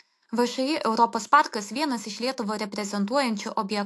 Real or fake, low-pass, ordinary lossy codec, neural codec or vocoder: fake; 14.4 kHz; AAC, 64 kbps; autoencoder, 48 kHz, 128 numbers a frame, DAC-VAE, trained on Japanese speech